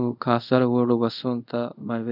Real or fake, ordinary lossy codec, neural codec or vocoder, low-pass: fake; none; codec, 24 kHz, 0.5 kbps, DualCodec; 5.4 kHz